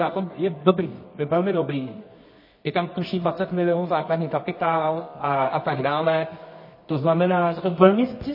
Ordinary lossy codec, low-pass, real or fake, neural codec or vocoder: MP3, 24 kbps; 5.4 kHz; fake; codec, 24 kHz, 0.9 kbps, WavTokenizer, medium music audio release